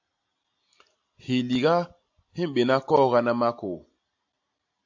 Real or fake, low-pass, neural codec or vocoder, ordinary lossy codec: real; 7.2 kHz; none; AAC, 48 kbps